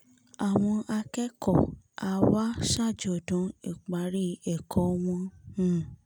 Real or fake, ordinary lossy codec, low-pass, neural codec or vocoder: real; none; none; none